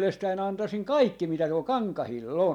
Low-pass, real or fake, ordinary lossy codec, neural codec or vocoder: 19.8 kHz; real; none; none